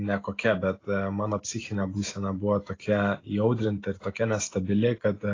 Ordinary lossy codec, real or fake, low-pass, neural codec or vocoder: AAC, 32 kbps; real; 7.2 kHz; none